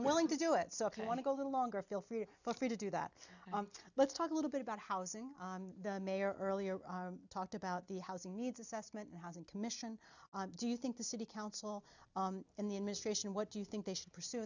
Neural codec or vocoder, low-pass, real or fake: none; 7.2 kHz; real